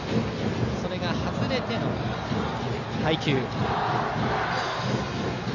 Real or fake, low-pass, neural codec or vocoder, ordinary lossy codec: real; 7.2 kHz; none; none